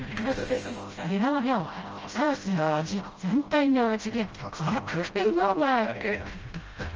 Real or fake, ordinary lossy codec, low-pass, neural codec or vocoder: fake; Opus, 24 kbps; 7.2 kHz; codec, 16 kHz, 0.5 kbps, FreqCodec, smaller model